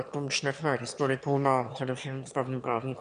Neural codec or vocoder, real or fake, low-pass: autoencoder, 22.05 kHz, a latent of 192 numbers a frame, VITS, trained on one speaker; fake; 9.9 kHz